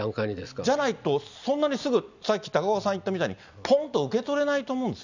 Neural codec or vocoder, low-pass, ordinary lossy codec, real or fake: none; 7.2 kHz; none; real